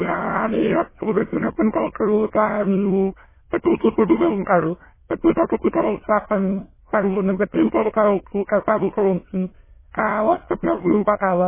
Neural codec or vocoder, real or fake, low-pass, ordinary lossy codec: autoencoder, 22.05 kHz, a latent of 192 numbers a frame, VITS, trained on many speakers; fake; 3.6 kHz; MP3, 16 kbps